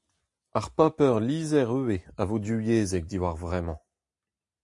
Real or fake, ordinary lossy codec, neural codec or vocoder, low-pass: real; AAC, 64 kbps; none; 10.8 kHz